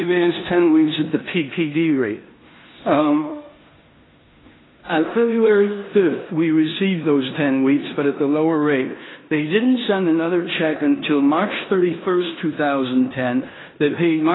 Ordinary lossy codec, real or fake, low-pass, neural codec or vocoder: AAC, 16 kbps; fake; 7.2 kHz; codec, 16 kHz in and 24 kHz out, 0.9 kbps, LongCat-Audio-Codec, four codebook decoder